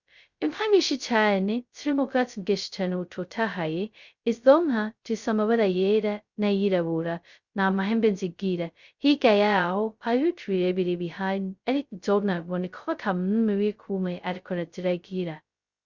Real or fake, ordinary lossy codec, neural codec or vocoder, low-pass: fake; Opus, 64 kbps; codec, 16 kHz, 0.2 kbps, FocalCodec; 7.2 kHz